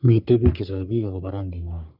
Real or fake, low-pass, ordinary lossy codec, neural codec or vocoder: fake; 5.4 kHz; none; codec, 44.1 kHz, 3.4 kbps, Pupu-Codec